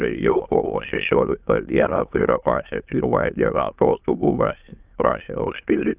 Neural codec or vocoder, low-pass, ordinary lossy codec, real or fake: autoencoder, 22.05 kHz, a latent of 192 numbers a frame, VITS, trained on many speakers; 3.6 kHz; Opus, 24 kbps; fake